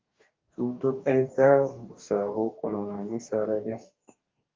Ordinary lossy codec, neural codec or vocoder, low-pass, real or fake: Opus, 32 kbps; codec, 44.1 kHz, 2.6 kbps, DAC; 7.2 kHz; fake